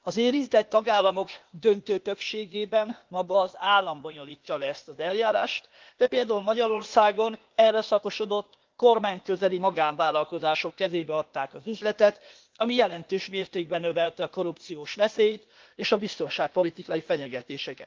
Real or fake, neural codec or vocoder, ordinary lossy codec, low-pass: fake; codec, 16 kHz, 0.8 kbps, ZipCodec; Opus, 32 kbps; 7.2 kHz